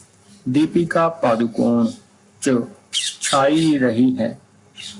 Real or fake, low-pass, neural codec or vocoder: fake; 10.8 kHz; codec, 44.1 kHz, 7.8 kbps, Pupu-Codec